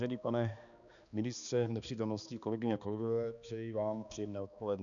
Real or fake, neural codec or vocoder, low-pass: fake; codec, 16 kHz, 2 kbps, X-Codec, HuBERT features, trained on balanced general audio; 7.2 kHz